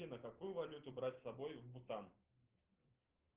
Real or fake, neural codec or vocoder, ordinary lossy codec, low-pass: real; none; Opus, 16 kbps; 3.6 kHz